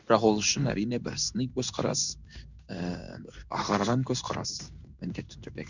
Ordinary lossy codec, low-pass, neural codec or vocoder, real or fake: none; 7.2 kHz; codec, 16 kHz in and 24 kHz out, 1 kbps, XY-Tokenizer; fake